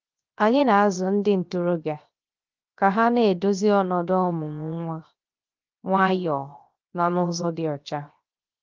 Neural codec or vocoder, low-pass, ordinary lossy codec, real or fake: codec, 16 kHz, 0.7 kbps, FocalCodec; 7.2 kHz; Opus, 24 kbps; fake